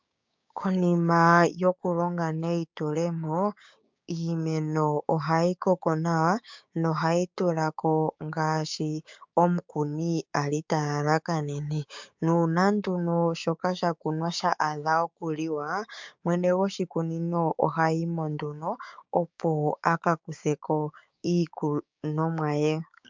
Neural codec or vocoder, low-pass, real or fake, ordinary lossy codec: codec, 16 kHz, 6 kbps, DAC; 7.2 kHz; fake; MP3, 64 kbps